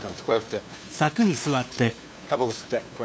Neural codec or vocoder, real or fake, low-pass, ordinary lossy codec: codec, 16 kHz, 2 kbps, FunCodec, trained on LibriTTS, 25 frames a second; fake; none; none